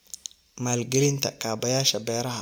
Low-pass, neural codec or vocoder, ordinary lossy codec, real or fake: none; none; none; real